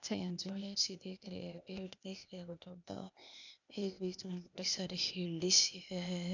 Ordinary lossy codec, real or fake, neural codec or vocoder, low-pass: none; fake; codec, 16 kHz, 0.8 kbps, ZipCodec; 7.2 kHz